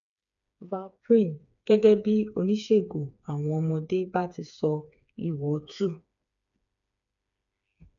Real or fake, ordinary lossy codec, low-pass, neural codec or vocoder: fake; none; 7.2 kHz; codec, 16 kHz, 4 kbps, FreqCodec, smaller model